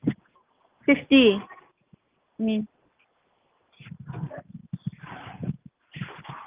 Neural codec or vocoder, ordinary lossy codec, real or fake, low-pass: none; Opus, 32 kbps; real; 3.6 kHz